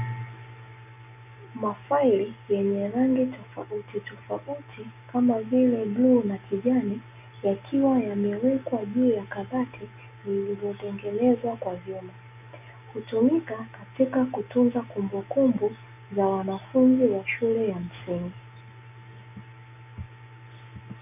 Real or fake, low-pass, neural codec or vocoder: real; 3.6 kHz; none